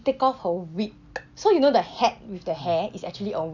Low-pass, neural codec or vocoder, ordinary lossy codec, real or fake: 7.2 kHz; none; none; real